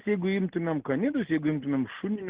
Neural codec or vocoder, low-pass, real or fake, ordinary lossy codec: none; 3.6 kHz; real; Opus, 16 kbps